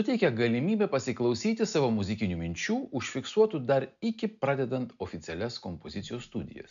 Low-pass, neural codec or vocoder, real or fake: 7.2 kHz; none; real